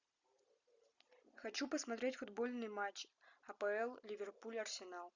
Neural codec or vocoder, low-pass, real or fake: none; 7.2 kHz; real